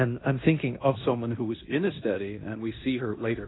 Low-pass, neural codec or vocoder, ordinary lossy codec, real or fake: 7.2 kHz; codec, 16 kHz in and 24 kHz out, 0.9 kbps, LongCat-Audio-Codec, fine tuned four codebook decoder; AAC, 16 kbps; fake